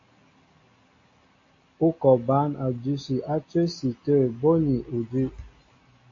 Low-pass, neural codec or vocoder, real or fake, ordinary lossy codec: 7.2 kHz; none; real; AAC, 32 kbps